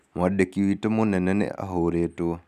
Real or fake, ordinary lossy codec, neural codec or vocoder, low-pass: real; none; none; 14.4 kHz